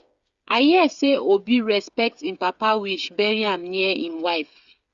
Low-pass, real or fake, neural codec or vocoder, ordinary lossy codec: 7.2 kHz; fake; codec, 16 kHz, 8 kbps, FreqCodec, smaller model; Opus, 64 kbps